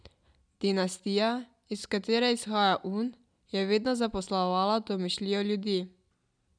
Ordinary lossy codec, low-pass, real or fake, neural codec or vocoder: none; 9.9 kHz; real; none